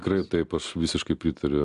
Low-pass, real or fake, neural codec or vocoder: 10.8 kHz; real; none